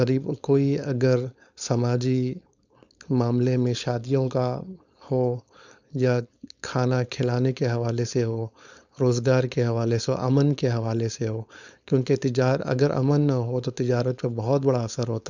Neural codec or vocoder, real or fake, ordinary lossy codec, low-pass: codec, 16 kHz, 4.8 kbps, FACodec; fake; none; 7.2 kHz